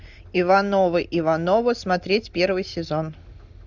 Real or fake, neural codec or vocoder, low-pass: real; none; 7.2 kHz